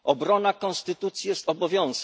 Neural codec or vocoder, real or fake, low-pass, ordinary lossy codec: none; real; none; none